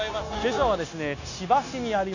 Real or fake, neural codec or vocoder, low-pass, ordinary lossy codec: fake; codec, 16 kHz, 0.9 kbps, LongCat-Audio-Codec; 7.2 kHz; none